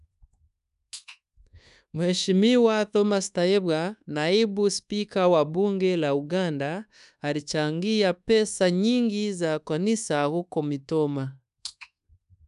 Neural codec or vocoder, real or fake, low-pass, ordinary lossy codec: codec, 24 kHz, 1.2 kbps, DualCodec; fake; 10.8 kHz; none